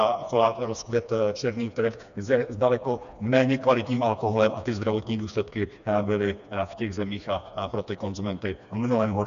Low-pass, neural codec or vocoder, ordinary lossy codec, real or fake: 7.2 kHz; codec, 16 kHz, 2 kbps, FreqCodec, smaller model; AAC, 96 kbps; fake